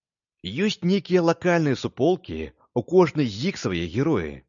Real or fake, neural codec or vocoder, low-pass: real; none; 7.2 kHz